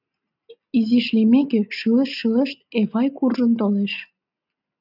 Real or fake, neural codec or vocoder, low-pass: real; none; 5.4 kHz